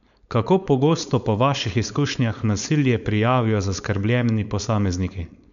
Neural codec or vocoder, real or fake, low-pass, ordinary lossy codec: codec, 16 kHz, 4.8 kbps, FACodec; fake; 7.2 kHz; none